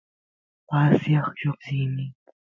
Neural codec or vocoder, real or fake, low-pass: none; real; 7.2 kHz